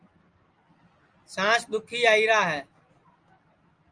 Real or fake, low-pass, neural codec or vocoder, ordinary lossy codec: real; 9.9 kHz; none; Opus, 32 kbps